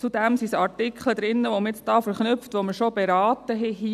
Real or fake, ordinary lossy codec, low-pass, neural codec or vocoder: real; none; 14.4 kHz; none